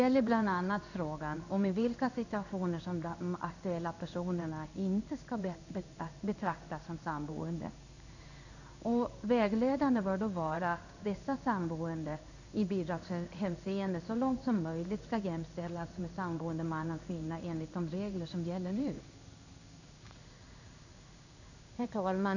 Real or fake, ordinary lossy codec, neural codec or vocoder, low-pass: fake; none; codec, 16 kHz in and 24 kHz out, 1 kbps, XY-Tokenizer; 7.2 kHz